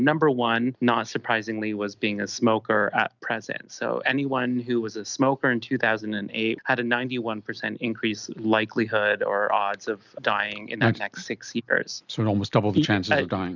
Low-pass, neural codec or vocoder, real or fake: 7.2 kHz; none; real